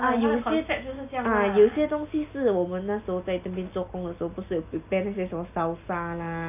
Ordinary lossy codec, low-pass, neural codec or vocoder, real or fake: none; 3.6 kHz; none; real